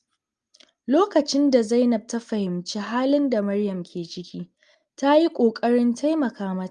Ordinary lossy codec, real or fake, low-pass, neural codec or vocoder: Opus, 32 kbps; real; 9.9 kHz; none